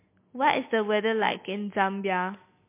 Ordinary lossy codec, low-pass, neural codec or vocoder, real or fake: MP3, 24 kbps; 3.6 kHz; none; real